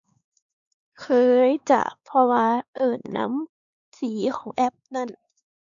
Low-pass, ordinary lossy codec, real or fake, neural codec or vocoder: 7.2 kHz; none; fake; codec, 16 kHz, 4 kbps, X-Codec, WavLM features, trained on Multilingual LibriSpeech